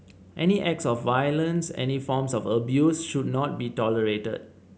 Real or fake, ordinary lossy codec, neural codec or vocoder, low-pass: real; none; none; none